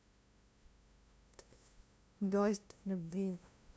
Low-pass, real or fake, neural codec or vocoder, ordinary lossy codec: none; fake; codec, 16 kHz, 0.5 kbps, FunCodec, trained on LibriTTS, 25 frames a second; none